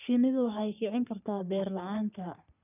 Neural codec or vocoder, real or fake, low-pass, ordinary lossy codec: codec, 44.1 kHz, 3.4 kbps, Pupu-Codec; fake; 3.6 kHz; none